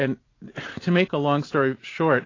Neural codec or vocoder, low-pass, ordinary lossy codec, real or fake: none; 7.2 kHz; AAC, 32 kbps; real